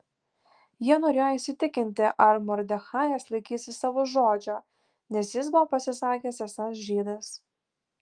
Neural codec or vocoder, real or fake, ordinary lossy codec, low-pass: autoencoder, 48 kHz, 128 numbers a frame, DAC-VAE, trained on Japanese speech; fake; Opus, 24 kbps; 9.9 kHz